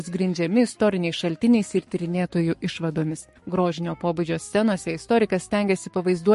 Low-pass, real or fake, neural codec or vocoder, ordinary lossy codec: 14.4 kHz; fake; codec, 44.1 kHz, 7.8 kbps, Pupu-Codec; MP3, 48 kbps